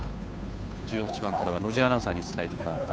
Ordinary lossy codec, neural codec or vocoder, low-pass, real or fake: none; codec, 16 kHz, 2 kbps, FunCodec, trained on Chinese and English, 25 frames a second; none; fake